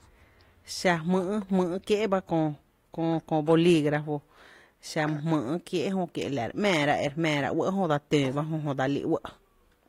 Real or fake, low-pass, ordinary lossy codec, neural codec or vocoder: real; 19.8 kHz; AAC, 48 kbps; none